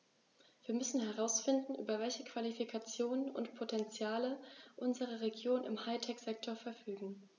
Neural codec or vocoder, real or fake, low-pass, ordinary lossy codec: none; real; 7.2 kHz; none